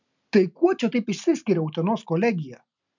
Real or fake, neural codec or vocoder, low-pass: real; none; 7.2 kHz